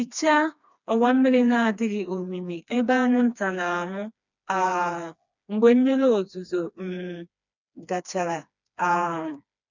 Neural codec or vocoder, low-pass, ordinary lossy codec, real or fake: codec, 16 kHz, 2 kbps, FreqCodec, smaller model; 7.2 kHz; none; fake